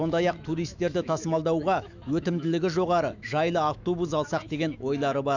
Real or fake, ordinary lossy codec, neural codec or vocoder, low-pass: fake; none; autoencoder, 48 kHz, 128 numbers a frame, DAC-VAE, trained on Japanese speech; 7.2 kHz